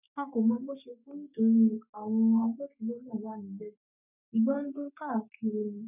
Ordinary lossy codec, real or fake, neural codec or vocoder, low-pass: none; fake; codec, 44.1 kHz, 3.4 kbps, Pupu-Codec; 3.6 kHz